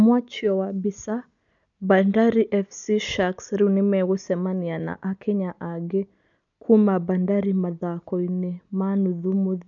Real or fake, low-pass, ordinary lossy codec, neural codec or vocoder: real; 7.2 kHz; none; none